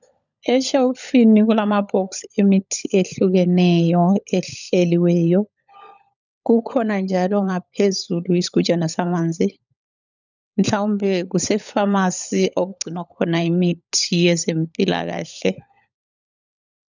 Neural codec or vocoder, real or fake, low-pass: codec, 16 kHz, 16 kbps, FunCodec, trained on LibriTTS, 50 frames a second; fake; 7.2 kHz